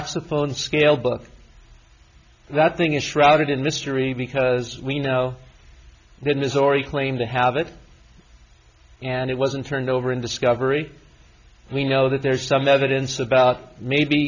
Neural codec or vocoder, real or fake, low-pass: none; real; 7.2 kHz